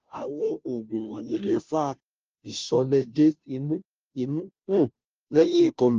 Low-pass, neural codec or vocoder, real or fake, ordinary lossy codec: 7.2 kHz; codec, 16 kHz, 0.5 kbps, FunCodec, trained on Chinese and English, 25 frames a second; fake; Opus, 24 kbps